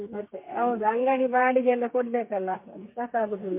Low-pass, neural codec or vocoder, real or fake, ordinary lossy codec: 3.6 kHz; codec, 32 kHz, 1.9 kbps, SNAC; fake; MP3, 32 kbps